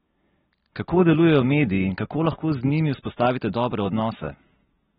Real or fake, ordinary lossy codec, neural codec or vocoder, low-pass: real; AAC, 16 kbps; none; 14.4 kHz